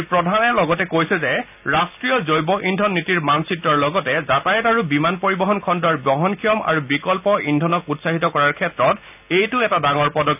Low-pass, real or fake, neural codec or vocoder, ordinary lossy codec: 3.6 kHz; real; none; none